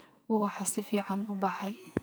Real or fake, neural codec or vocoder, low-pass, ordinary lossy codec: fake; codec, 44.1 kHz, 2.6 kbps, SNAC; none; none